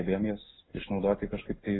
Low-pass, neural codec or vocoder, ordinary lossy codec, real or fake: 7.2 kHz; none; AAC, 16 kbps; real